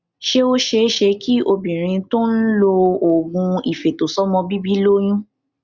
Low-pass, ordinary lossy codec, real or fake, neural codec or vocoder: 7.2 kHz; Opus, 64 kbps; real; none